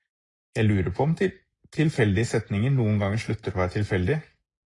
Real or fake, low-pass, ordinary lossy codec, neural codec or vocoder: real; 10.8 kHz; AAC, 32 kbps; none